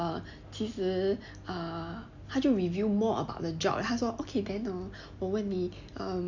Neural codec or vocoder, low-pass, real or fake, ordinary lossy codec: none; 7.2 kHz; real; none